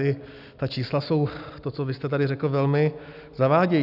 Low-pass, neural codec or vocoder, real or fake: 5.4 kHz; none; real